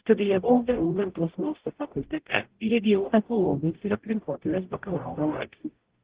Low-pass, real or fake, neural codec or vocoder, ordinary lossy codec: 3.6 kHz; fake; codec, 44.1 kHz, 0.9 kbps, DAC; Opus, 16 kbps